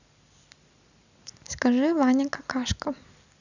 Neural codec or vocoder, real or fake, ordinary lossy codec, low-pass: none; real; none; 7.2 kHz